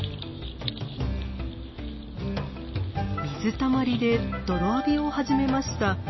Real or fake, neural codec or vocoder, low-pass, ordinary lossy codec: real; none; 7.2 kHz; MP3, 24 kbps